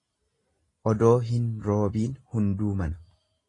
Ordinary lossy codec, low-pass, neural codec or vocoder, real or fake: AAC, 32 kbps; 10.8 kHz; none; real